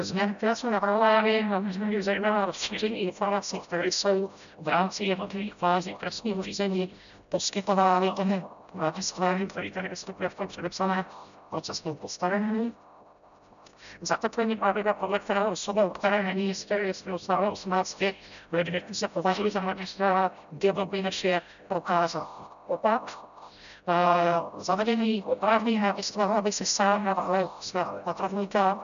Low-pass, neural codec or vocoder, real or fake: 7.2 kHz; codec, 16 kHz, 0.5 kbps, FreqCodec, smaller model; fake